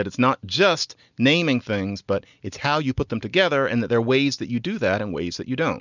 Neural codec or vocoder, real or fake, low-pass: none; real; 7.2 kHz